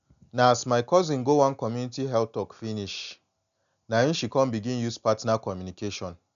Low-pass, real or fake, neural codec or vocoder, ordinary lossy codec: 7.2 kHz; real; none; none